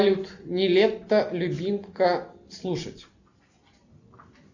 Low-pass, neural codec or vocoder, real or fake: 7.2 kHz; none; real